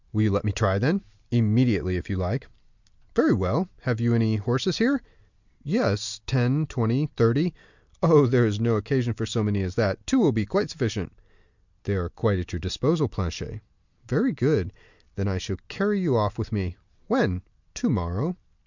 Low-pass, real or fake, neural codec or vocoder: 7.2 kHz; real; none